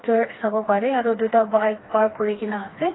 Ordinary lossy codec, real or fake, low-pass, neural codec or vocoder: AAC, 16 kbps; fake; 7.2 kHz; codec, 16 kHz, 2 kbps, FreqCodec, smaller model